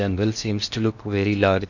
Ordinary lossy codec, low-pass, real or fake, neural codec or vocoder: none; 7.2 kHz; fake; codec, 16 kHz in and 24 kHz out, 0.8 kbps, FocalCodec, streaming, 65536 codes